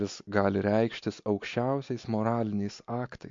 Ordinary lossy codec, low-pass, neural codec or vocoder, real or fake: MP3, 48 kbps; 7.2 kHz; none; real